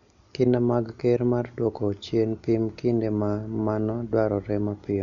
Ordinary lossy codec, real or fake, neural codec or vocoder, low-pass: none; real; none; 7.2 kHz